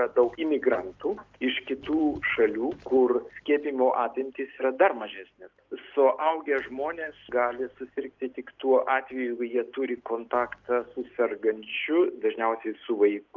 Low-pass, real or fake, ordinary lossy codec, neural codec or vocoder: 7.2 kHz; real; Opus, 24 kbps; none